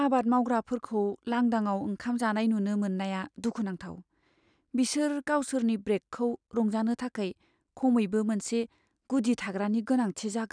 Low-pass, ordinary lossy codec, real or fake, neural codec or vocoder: 9.9 kHz; none; real; none